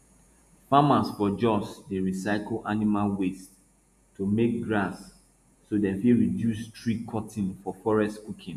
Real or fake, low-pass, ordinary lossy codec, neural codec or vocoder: fake; 14.4 kHz; none; vocoder, 48 kHz, 128 mel bands, Vocos